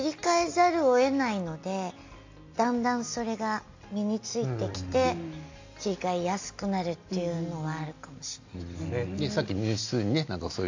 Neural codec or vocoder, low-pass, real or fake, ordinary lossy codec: none; 7.2 kHz; real; AAC, 48 kbps